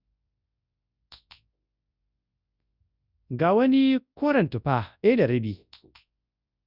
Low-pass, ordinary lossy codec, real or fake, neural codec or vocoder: 5.4 kHz; none; fake; codec, 24 kHz, 0.9 kbps, WavTokenizer, large speech release